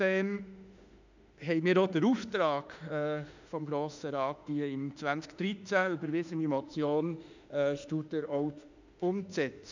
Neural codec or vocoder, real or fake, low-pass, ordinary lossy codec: autoencoder, 48 kHz, 32 numbers a frame, DAC-VAE, trained on Japanese speech; fake; 7.2 kHz; none